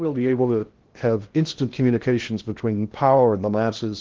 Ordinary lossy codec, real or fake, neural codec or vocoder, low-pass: Opus, 16 kbps; fake; codec, 16 kHz in and 24 kHz out, 0.6 kbps, FocalCodec, streaming, 2048 codes; 7.2 kHz